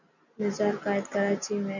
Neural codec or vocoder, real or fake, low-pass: none; real; 7.2 kHz